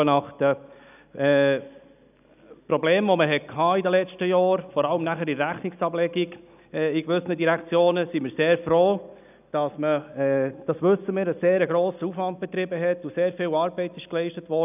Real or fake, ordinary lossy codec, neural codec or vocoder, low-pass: real; none; none; 3.6 kHz